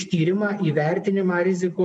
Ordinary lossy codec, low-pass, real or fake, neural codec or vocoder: MP3, 96 kbps; 10.8 kHz; fake; vocoder, 24 kHz, 100 mel bands, Vocos